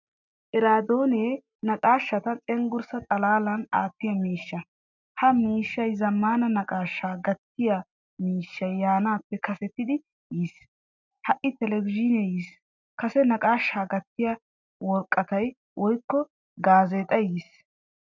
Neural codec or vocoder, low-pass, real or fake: none; 7.2 kHz; real